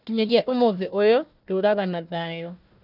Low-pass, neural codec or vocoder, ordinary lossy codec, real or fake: 5.4 kHz; codec, 16 kHz, 1 kbps, FunCodec, trained on Chinese and English, 50 frames a second; none; fake